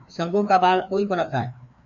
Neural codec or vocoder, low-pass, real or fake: codec, 16 kHz, 2 kbps, FreqCodec, larger model; 7.2 kHz; fake